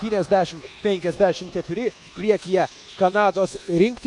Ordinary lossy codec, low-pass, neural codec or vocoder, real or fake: AAC, 64 kbps; 10.8 kHz; codec, 24 kHz, 1.2 kbps, DualCodec; fake